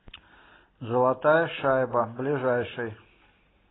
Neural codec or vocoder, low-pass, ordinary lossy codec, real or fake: none; 7.2 kHz; AAC, 16 kbps; real